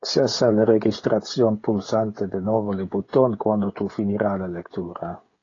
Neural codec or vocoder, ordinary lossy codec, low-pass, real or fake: codec, 16 kHz, 16 kbps, FreqCodec, smaller model; AAC, 32 kbps; 7.2 kHz; fake